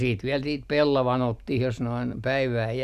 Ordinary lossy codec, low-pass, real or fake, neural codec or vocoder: none; 14.4 kHz; real; none